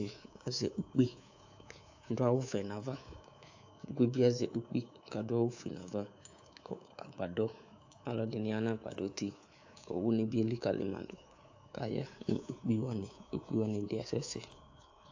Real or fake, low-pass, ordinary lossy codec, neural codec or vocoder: fake; 7.2 kHz; AAC, 48 kbps; codec, 24 kHz, 3.1 kbps, DualCodec